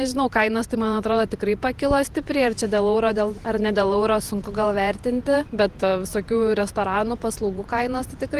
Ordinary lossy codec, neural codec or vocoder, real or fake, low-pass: Opus, 24 kbps; vocoder, 48 kHz, 128 mel bands, Vocos; fake; 14.4 kHz